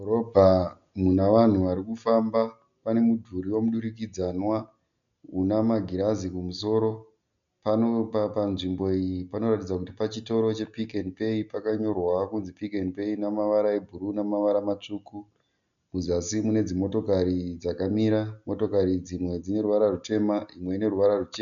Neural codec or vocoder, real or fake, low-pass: none; real; 7.2 kHz